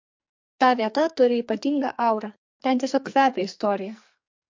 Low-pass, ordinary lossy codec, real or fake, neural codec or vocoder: 7.2 kHz; MP3, 48 kbps; fake; codec, 44.1 kHz, 2.6 kbps, SNAC